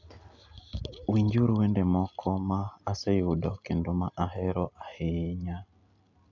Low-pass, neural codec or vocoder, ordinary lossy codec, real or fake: 7.2 kHz; none; none; real